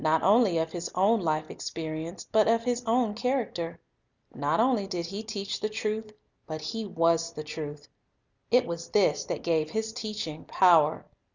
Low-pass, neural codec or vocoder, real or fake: 7.2 kHz; none; real